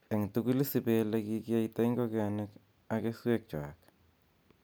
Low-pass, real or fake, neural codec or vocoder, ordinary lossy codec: none; real; none; none